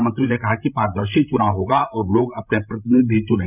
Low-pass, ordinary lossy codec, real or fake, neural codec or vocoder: 3.6 kHz; none; fake; codec, 16 kHz, 16 kbps, FreqCodec, larger model